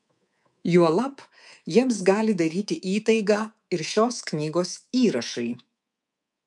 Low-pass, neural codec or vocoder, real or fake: 10.8 kHz; codec, 24 kHz, 3.1 kbps, DualCodec; fake